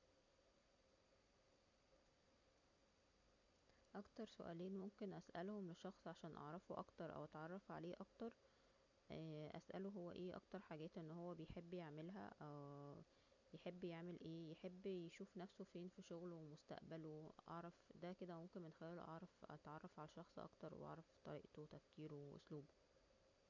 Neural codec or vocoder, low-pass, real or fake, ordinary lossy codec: none; 7.2 kHz; real; none